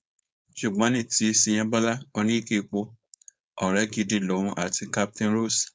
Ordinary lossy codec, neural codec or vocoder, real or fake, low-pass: none; codec, 16 kHz, 4.8 kbps, FACodec; fake; none